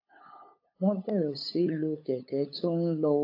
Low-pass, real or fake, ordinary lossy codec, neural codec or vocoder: 5.4 kHz; fake; AAC, 32 kbps; codec, 16 kHz, 8 kbps, FunCodec, trained on LibriTTS, 25 frames a second